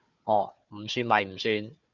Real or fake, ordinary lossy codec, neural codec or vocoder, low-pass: fake; Opus, 64 kbps; codec, 16 kHz, 4 kbps, FunCodec, trained on Chinese and English, 50 frames a second; 7.2 kHz